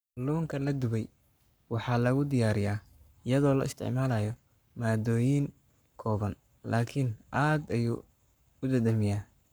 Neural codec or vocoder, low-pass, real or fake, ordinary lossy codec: codec, 44.1 kHz, 7.8 kbps, Pupu-Codec; none; fake; none